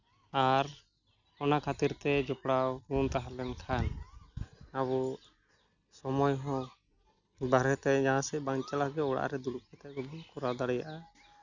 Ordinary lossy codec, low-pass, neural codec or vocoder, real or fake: none; 7.2 kHz; none; real